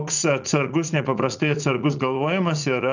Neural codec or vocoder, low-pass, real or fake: vocoder, 44.1 kHz, 80 mel bands, Vocos; 7.2 kHz; fake